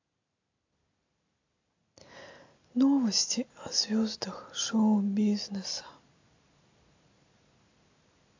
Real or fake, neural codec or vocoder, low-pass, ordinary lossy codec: real; none; 7.2 kHz; AAC, 48 kbps